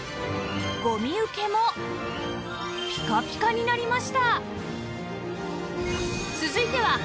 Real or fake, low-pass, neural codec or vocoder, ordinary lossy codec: real; none; none; none